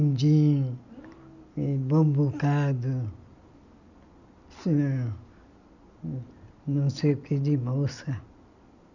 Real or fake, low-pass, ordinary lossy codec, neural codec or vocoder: real; 7.2 kHz; none; none